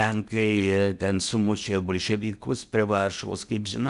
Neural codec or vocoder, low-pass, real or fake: codec, 16 kHz in and 24 kHz out, 0.8 kbps, FocalCodec, streaming, 65536 codes; 10.8 kHz; fake